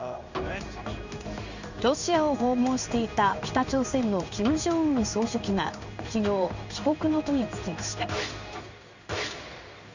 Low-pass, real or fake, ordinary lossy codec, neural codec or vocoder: 7.2 kHz; fake; none; codec, 16 kHz in and 24 kHz out, 1 kbps, XY-Tokenizer